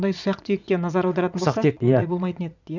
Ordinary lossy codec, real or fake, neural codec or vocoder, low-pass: none; fake; vocoder, 44.1 kHz, 80 mel bands, Vocos; 7.2 kHz